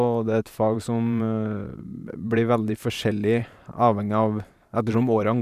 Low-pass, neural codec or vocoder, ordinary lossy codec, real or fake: 14.4 kHz; vocoder, 44.1 kHz, 128 mel bands every 512 samples, BigVGAN v2; none; fake